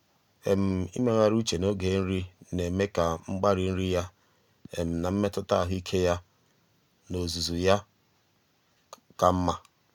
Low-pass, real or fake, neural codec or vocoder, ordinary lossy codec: 19.8 kHz; real; none; none